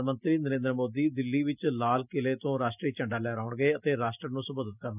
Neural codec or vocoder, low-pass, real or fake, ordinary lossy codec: none; 3.6 kHz; real; none